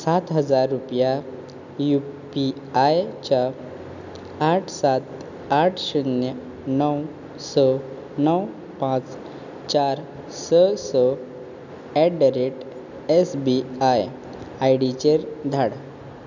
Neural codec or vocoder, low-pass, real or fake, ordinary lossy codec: none; 7.2 kHz; real; none